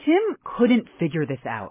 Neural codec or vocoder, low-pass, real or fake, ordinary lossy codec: none; 3.6 kHz; real; MP3, 16 kbps